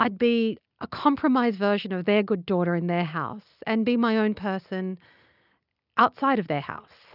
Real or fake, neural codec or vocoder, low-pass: real; none; 5.4 kHz